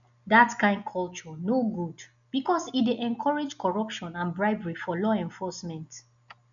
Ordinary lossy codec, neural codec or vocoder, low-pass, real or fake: none; none; 7.2 kHz; real